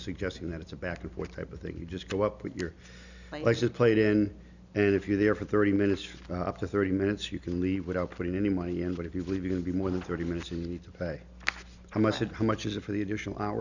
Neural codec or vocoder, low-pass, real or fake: none; 7.2 kHz; real